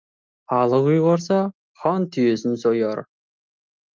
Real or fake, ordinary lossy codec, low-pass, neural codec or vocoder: real; Opus, 24 kbps; 7.2 kHz; none